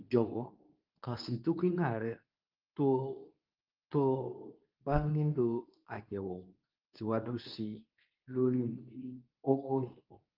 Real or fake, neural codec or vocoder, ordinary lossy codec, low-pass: fake; codec, 24 kHz, 0.9 kbps, WavTokenizer, medium speech release version 2; Opus, 32 kbps; 5.4 kHz